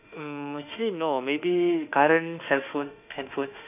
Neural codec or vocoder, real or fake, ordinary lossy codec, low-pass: autoencoder, 48 kHz, 32 numbers a frame, DAC-VAE, trained on Japanese speech; fake; none; 3.6 kHz